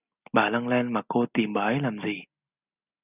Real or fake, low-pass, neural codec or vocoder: real; 3.6 kHz; none